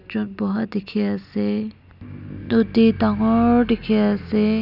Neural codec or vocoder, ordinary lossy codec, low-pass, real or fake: none; none; 5.4 kHz; real